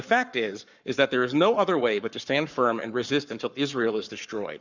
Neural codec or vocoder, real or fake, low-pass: codec, 44.1 kHz, 7.8 kbps, Pupu-Codec; fake; 7.2 kHz